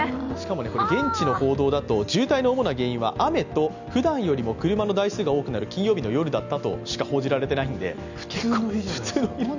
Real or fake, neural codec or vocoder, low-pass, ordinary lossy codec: real; none; 7.2 kHz; none